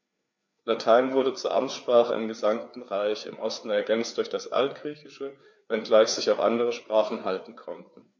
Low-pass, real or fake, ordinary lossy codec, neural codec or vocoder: 7.2 kHz; fake; MP3, 48 kbps; codec, 16 kHz, 4 kbps, FreqCodec, larger model